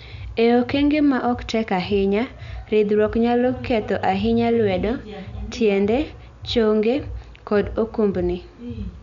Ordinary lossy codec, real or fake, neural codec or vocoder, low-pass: none; real; none; 7.2 kHz